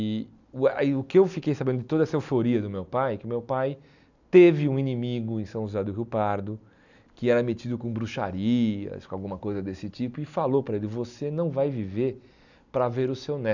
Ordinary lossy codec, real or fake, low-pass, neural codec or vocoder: none; real; 7.2 kHz; none